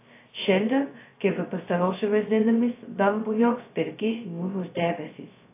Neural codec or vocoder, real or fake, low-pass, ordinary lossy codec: codec, 16 kHz, 0.2 kbps, FocalCodec; fake; 3.6 kHz; AAC, 24 kbps